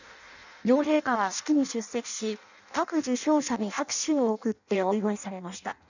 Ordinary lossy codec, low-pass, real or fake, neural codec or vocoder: none; 7.2 kHz; fake; codec, 16 kHz in and 24 kHz out, 0.6 kbps, FireRedTTS-2 codec